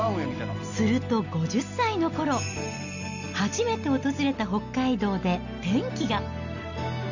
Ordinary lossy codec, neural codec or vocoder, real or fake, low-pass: none; none; real; 7.2 kHz